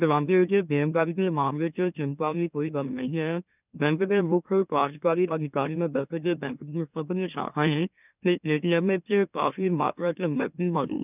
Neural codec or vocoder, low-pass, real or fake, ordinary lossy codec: autoencoder, 44.1 kHz, a latent of 192 numbers a frame, MeloTTS; 3.6 kHz; fake; none